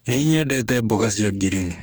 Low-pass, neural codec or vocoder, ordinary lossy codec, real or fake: none; codec, 44.1 kHz, 2.6 kbps, DAC; none; fake